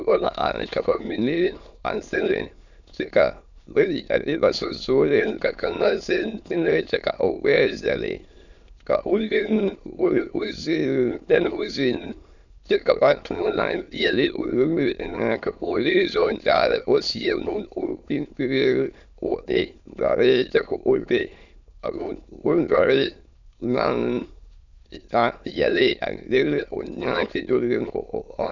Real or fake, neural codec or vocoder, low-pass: fake; autoencoder, 22.05 kHz, a latent of 192 numbers a frame, VITS, trained on many speakers; 7.2 kHz